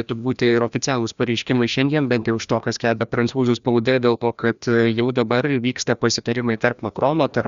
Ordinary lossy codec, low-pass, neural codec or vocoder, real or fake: Opus, 64 kbps; 7.2 kHz; codec, 16 kHz, 1 kbps, FreqCodec, larger model; fake